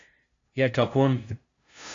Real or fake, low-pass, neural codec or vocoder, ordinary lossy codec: fake; 7.2 kHz; codec, 16 kHz, 0.5 kbps, FunCodec, trained on LibriTTS, 25 frames a second; AAC, 48 kbps